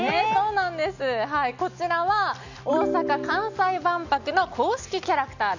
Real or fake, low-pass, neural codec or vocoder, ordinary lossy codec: real; 7.2 kHz; none; none